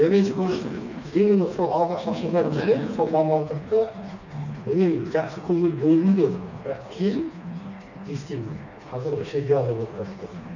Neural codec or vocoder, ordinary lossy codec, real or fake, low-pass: codec, 16 kHz, 2 kbps, FreqCodec, smaller model; none; fake; 7.2 kHz